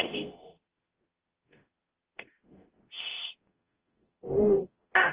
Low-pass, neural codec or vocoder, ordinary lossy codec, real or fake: 3.6 kHz; codec, 44.1 kHz, 0.9 kbps, DAC; Opus, 16 kbps; fake